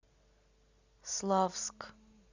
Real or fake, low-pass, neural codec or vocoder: real; 7.2 kHz; none